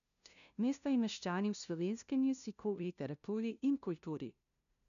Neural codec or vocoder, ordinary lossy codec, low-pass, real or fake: codec, 16 kHz, 0.5 kbps, FunCodec, trained on LibriTTS, 25 frames a second; none; 7.2 kHz; fake